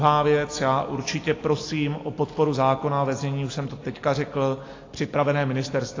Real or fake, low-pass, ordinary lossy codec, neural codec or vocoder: real; 7.2 kHz; AAC, 32 kbps; none